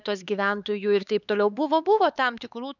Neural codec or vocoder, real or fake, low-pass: codec, 16 kHz, 4 kbps, X-Codec, HuBERT features, trained on LibriSpeech; fake; 7.2 kHz